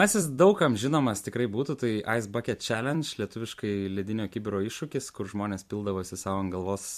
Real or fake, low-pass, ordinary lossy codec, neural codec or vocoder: fake; 14.4 kHz; MP3, 64 kbps; vocoder, 44.1 kHz, 128 mel bands every 256 samples, BigVGAN v2